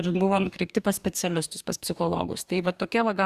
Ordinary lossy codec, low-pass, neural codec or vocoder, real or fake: Opus, 64 kbps; 14.4 kHz; codec, 44.1 kHz, 2.6 kbps, DAC; fake